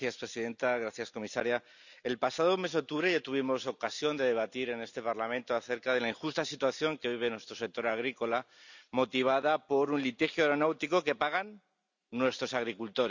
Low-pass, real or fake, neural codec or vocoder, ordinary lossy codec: 7.2 kHz; real; none; none